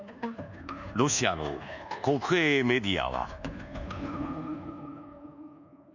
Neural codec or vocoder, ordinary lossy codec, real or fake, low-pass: codec, 24 kHz, 1.2 kbps, DualCodec; none; fake; 7.2 kHz